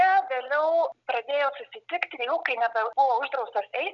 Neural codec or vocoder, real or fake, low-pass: none; real; 7.2 kHz